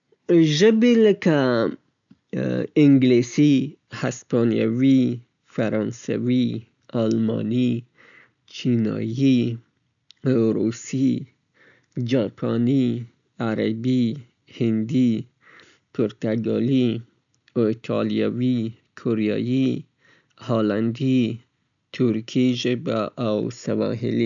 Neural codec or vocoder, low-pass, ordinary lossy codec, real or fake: none; 7.2 kHz; none; real